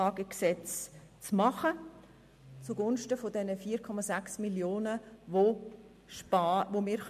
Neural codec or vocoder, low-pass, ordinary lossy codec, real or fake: none; 14.4 kHz; MP3, 64 kbps; real